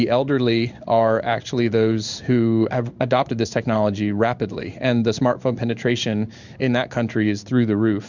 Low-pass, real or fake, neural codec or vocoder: 7.2 kHz; fake; codec, 16 kHz in and 24 kHz out, 1 kbps, XY-Tokenizer